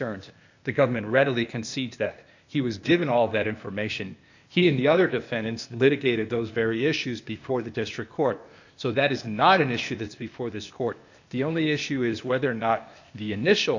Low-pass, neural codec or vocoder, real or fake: 7.2 kHz; codec, 16 kHz, 0.8 kbps, ZipCodec; fake